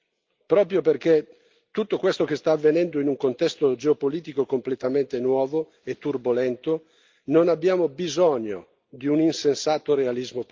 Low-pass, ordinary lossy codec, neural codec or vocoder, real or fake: 7.2 kHz; Opus, 32 kbps; none; real